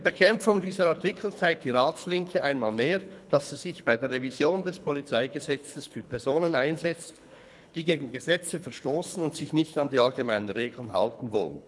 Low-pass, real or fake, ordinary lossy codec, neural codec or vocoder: none; fake; none; codec, 24 kHz, 3 kbps, HILCodec